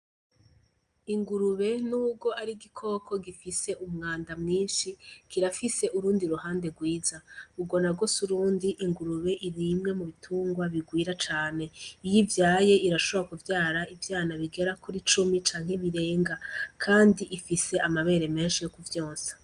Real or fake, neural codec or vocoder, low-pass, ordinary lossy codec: real; none; 9.9 kHz; Opus, 32 kbps